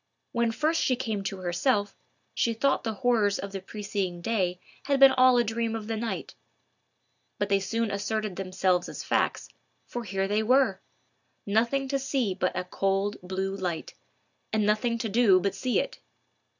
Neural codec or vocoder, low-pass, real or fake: none; 7.2 kHz; real